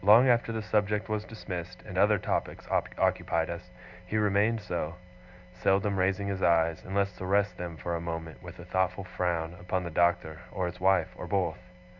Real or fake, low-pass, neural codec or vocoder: real; 7.2 kHz; none